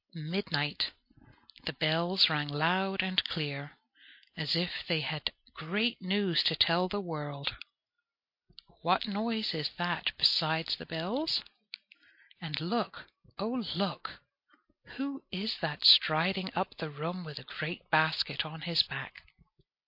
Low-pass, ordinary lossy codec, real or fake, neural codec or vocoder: 5.4 kHz; MP3, 32 kbps; real; none